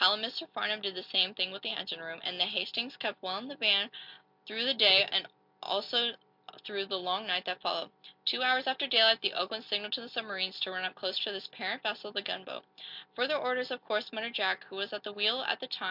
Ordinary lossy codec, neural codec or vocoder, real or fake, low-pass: MP3, 48 kbps; none; real; 5.4 kHz